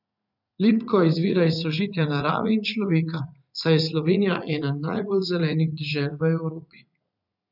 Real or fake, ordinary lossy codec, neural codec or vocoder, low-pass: fake; none; vocoder, 44.1 kHz, 80 mel bands, Vocos; 5.4 kHz